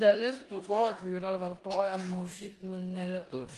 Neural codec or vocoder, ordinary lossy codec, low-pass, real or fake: codec, 16 kHz in and 24 kHz out, 0.9 kbps, LongCat-Audio-Codec, four codebook decoder; Opus, 32 kbps; 10.8 kHz; fake